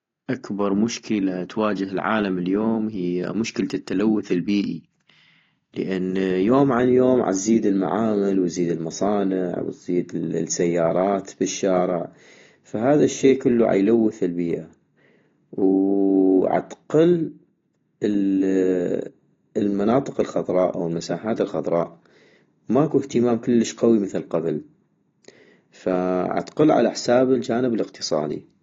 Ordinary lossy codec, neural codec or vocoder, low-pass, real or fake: AAC, 32 kbps; none; 7.2 kHz; real